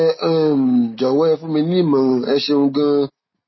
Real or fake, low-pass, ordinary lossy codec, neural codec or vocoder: real; 7.2 kHz; MP3, 24 kbps; none